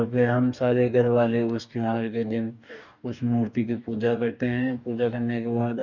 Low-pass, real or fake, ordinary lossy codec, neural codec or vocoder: 7.2 kHz; fake; none; codec, 44.1 kHz, 2.6 kbps, DAC